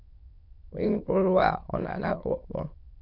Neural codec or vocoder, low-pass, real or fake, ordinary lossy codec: autoencoder, 22.05 kHz, a latent of 192 numbers a frame, VITS, trained on many speakers; 5.4 kHz; fake; Opus, 64 kbps